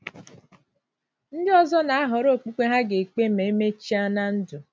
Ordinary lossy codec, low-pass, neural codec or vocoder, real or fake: none; none; none; real